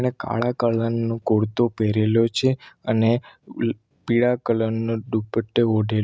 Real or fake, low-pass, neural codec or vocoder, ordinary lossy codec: real; none; none; none